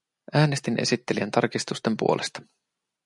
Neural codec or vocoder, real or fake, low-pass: none; real; 10.8 kHz